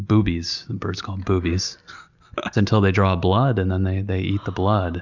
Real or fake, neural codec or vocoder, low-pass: real; none; 7.2 kHz